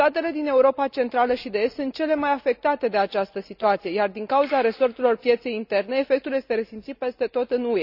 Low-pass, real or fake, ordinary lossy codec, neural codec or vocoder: 5.4 kHz; real; none; none